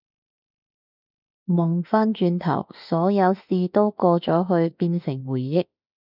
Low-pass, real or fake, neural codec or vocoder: 5.4 kHz; fake; autoencoder, 48 kHz, 32 numbers a frame, DAC-VAE, trained on Japanese speech